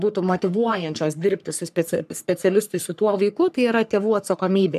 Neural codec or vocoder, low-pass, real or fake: codec, 44.1 kHz, 3.4 kbps, Pupu-Codec; 14.4 kHz; fake